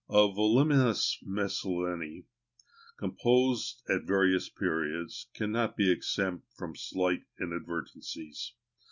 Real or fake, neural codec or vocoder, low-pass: real; none; 7.2 kHz